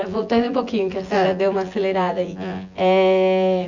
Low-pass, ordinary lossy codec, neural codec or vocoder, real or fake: 7.2 kHz; Opus, 64 kbps; vocoder, 24 kHz, 100 mel bands, Vocos; fake